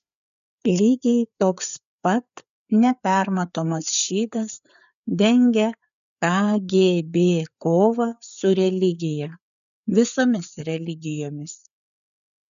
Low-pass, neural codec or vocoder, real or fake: 7.2 kHz; codec, 16 kHz, 4 kbps, FreqCodec, larger model; fake